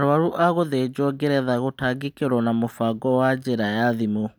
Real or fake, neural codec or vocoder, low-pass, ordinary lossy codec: real; none; none; none